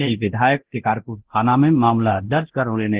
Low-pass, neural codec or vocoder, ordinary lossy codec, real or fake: 3.6 kHz; codec, 24 kHz, 0.9 kbps, DualCodec; Opus, 16 kbps; fake